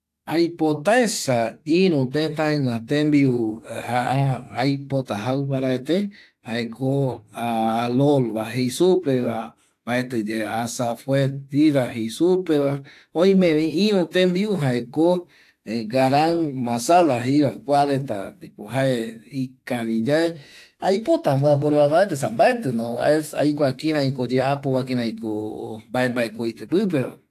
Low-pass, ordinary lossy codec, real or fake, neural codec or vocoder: 14.4 kHz; AAC, 64 kbps; fake; autoencoder, 48 kHz, 32 numbers a frame, DAC-VAE, trained on Japanese speech